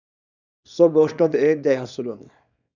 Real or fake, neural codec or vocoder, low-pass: fake; codec, 24 kHz, 0.9 kbps, WavTokenizer, small release; 7.2 kHz